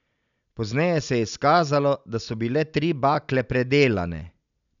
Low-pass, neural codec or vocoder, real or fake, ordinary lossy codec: 7.2 kHz; none; real; none